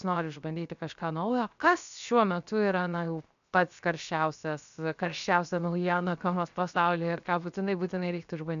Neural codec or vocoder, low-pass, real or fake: codec, 16 kHz, 0.7 kbps, FocalCodec; 7.2 kHz; fake